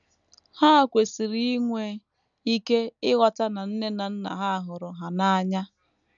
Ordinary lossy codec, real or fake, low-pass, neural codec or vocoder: none; real; 7.2 kHz; none